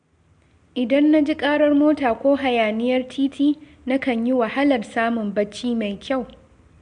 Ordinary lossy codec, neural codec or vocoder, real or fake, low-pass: MP3, 64 kbps; none; real; 9.9 kHz